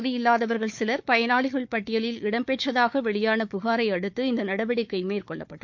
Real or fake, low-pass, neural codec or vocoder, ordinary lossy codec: fake; 7.2 kHz; codec, 16 kHz, 4 kbps, FunCodec, trained on Chinese and English, 50 frames a second; MP3, 48 kbps